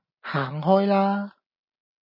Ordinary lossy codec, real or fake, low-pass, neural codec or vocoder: MP3, 24 kbps; real; 5.4 kHz; none